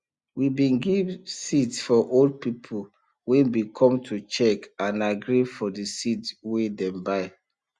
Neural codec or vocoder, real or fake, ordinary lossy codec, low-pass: none; real; Opus, 64 kbps; 10.8 kHz